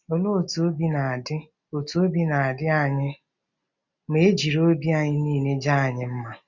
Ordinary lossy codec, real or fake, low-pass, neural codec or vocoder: none; real; 7.2 kHz; none